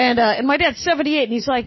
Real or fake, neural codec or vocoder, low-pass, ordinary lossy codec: real; none; 7.2 kHz; MP3, 24 kbps